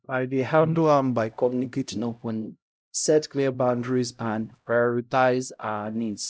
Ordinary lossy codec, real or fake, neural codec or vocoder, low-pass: none; fake; codec, 16 kHz, 0.5 kbps, X-Codec, HuBERT features, trained on LibriSpeech; none